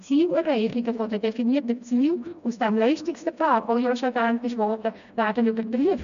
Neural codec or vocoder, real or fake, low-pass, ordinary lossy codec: codec, 16 kHz, 1 kbps, FreqCodec, smaller model; fake; 7.2 kHz; none